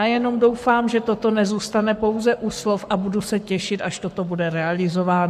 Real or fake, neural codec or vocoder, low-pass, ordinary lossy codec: fake; codec, 44.1 kHz, 7.8 kbps, Pupu-Codec; 14.4 kHz; MP3, 96 kbps